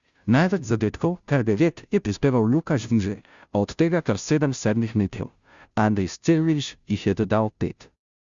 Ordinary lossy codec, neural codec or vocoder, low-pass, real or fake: Opus, 64 kbps; codec, 16 kHz, 0.5 kbps, FunCodec, trained on Chinese and English, 25 frames a second; 7.2 kHz; fake